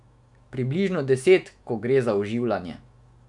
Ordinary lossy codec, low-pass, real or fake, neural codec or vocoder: none; 10.8 kHz; fake; autoencoder, 48 kHz, 128 numbers a frame, DAC-VAE, trained on Japanese speech